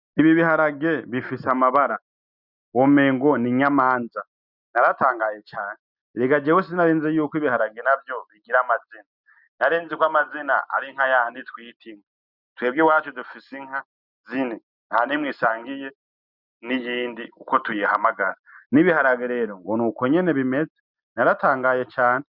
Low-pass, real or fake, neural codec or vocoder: 5.4 kHz; real; none